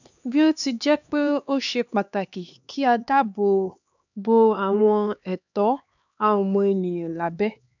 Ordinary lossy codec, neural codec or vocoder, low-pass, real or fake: none; codec, 16 kHz, 2 kbps, X-Codec, HuBERT features, trained on LibriSpeech; 7.2 kHz; fake